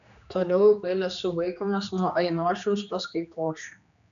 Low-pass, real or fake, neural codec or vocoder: 7.2 kHz; fake; codec, 16 kHz, 2 kbps, X-Codec, HuBERT features, trained on general audio